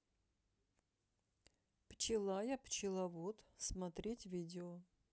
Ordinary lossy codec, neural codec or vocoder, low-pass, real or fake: none; none; none; real